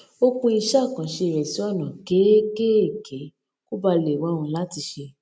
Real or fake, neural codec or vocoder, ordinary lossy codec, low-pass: real; none; none; none